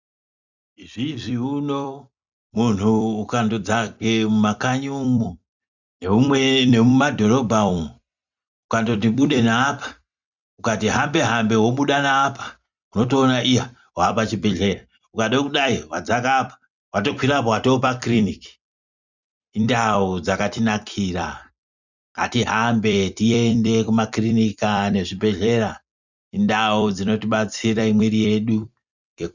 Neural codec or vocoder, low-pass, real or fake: vocoder, 44.1 kHz, 128 mel bands every 256 samples, BigVGAN v2; 7.2 kHz; fake